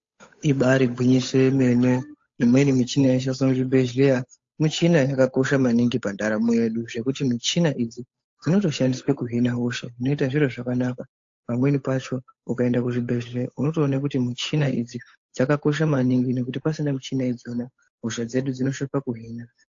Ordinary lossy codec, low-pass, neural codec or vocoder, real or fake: AAC, 48 kbps; 7.2 kHz; codec, 16 kHz, 8 kbps, FunCodec, trained on Chinese and English, 25 frames a second; fake